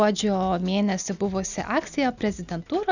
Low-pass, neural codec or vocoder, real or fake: 7.2 kHz; none; real